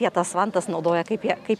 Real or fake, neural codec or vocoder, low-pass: real; none; 14.4 kHz